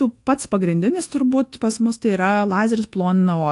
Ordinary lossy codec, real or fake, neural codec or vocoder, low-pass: AAC, 48 kbps; fake; codec, 24 kHz, 1.2 kbps, DualCodec; 10.8 kHz